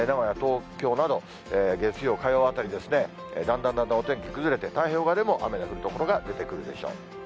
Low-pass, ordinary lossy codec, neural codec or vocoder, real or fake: none; none; none; real